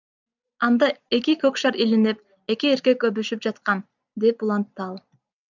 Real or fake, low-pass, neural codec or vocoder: real; 7.2 kHz; none